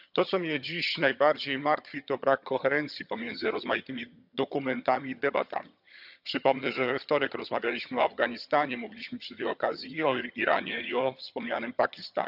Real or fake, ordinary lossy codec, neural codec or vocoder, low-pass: fake; none; vocoder, 22.05 kHz, 80 mel bands, HiFi-GAN; 5.4 kHz